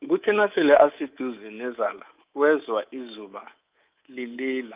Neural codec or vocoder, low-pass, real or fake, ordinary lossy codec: codec, 24 kHz, 3.1 kbps, DualCodec; 3.6 kHz; fake; Opus, 24 kbps